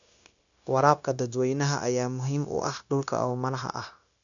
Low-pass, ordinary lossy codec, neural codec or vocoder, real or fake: 7.2 kHz; none; codec, 16 kHz, 0.9 kbps, LongCat-Audio-Codec; fake